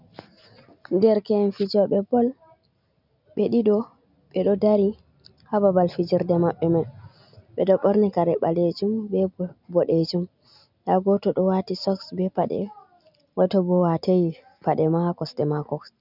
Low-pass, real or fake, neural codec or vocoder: 5.4 kHz; real; none